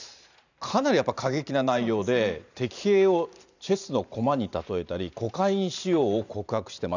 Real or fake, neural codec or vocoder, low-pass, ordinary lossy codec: fake; vocoder, 44.1 kHz, 128 mel bands every 512 samples, BigVGAN v2; 7.2 kHz; none